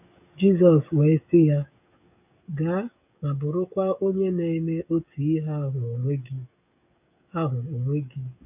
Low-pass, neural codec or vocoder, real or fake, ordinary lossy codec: 3.6 kHz; codec, 16 kHz, 16 kbps, FreqCodec, smaller model; fake; none